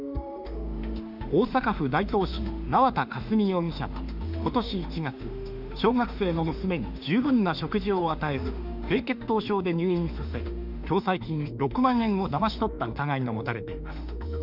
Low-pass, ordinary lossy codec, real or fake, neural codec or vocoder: 5.4 kHz; none; fake; autoencoder, 48 kHz, 32 numbers a frame, DAC-VAE, trained on Japanese speech